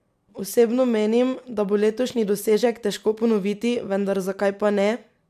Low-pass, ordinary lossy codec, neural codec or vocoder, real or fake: 14.4 kHz; MP3, 96 kbps; none; real